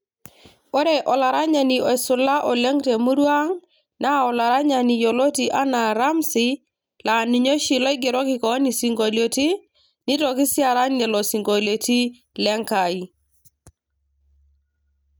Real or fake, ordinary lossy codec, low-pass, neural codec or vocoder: real; none; none; none